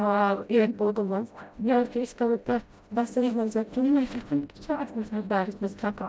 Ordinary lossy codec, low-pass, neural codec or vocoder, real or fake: none; none; codec, 16 kHz, 0.5 kbps, FreqCodec, smaller model; fake